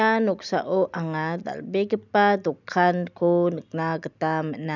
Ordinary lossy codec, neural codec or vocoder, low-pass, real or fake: none; none; 7.2 kHz; real